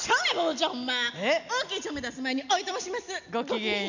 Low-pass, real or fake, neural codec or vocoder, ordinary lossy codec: 7.2 kHz; real; none; none